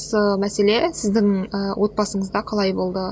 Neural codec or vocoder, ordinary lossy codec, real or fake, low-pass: none; none; real; none